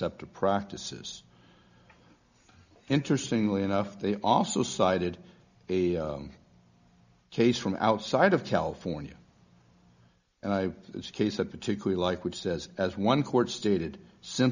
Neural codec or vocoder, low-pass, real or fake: none; 7.2 kHz; real